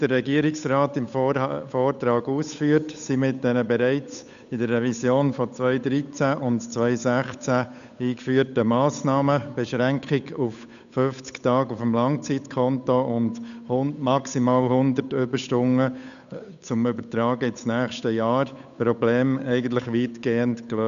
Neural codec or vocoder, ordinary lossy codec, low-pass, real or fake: codec, 16 kHz, 8 kbps, FunCodec, trained on Chinese and English, 25 frames a second; none; 7.2 kHz; fake